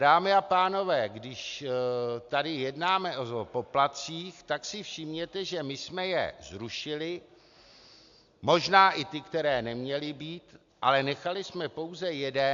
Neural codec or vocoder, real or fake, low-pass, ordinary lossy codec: none; real; 7.2 kHz; AAC, 64 kbps